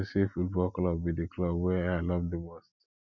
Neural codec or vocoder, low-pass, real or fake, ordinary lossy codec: none; 7.2 kHz; real; none